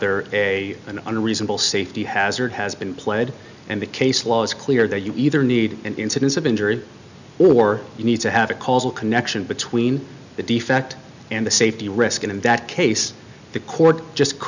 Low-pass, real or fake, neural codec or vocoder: 7.2 kHz; real; none